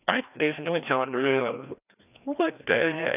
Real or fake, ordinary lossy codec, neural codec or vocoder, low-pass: fake; none; codec, 16 kHz, 1 kbps, FreqCodec, larger model; 3.6 kHz